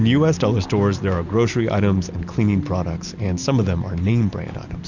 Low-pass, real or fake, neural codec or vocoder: 7.2 kHz; real; none